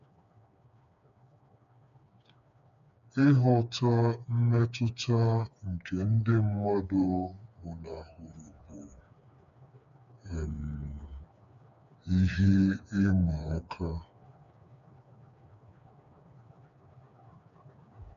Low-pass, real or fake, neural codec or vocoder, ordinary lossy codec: 7.2 kHz; fake; codec, 16 kHz, 4 kbps, FreqCodec, smaller model; none